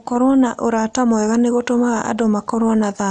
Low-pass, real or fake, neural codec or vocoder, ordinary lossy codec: 9.9 kHz; real; none; none